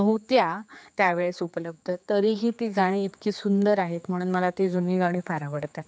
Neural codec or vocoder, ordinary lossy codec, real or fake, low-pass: codec, 16 kHz, 4 kbps, X-Codec, HuBERT features, trained on general audio; none; fake; none